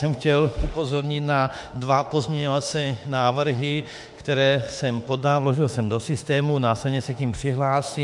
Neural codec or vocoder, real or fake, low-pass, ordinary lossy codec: autoencoder, 48 kHz, 32 numbers a frame, DAC-VAE, trained on Japanese speech; fake; 10.8 kHz; MP3, 64 kbps